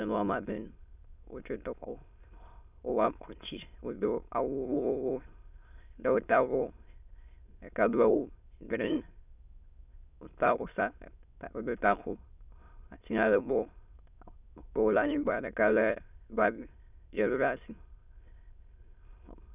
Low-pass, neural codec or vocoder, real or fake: 3.6 kHz; autoencoder, 22.05 kHz, a latent of 192 numbers a frame, VITS, trained on many speakers; fake